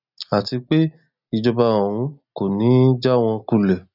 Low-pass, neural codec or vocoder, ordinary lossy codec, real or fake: 5.4 kHz; none; none; real